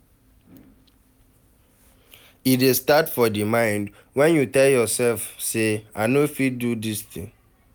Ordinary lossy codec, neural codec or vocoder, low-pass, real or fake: none; none; none; real